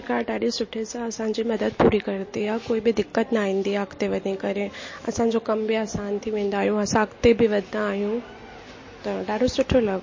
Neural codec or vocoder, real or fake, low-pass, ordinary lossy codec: none; real; 7.2 kHz; MP3, 32 kbps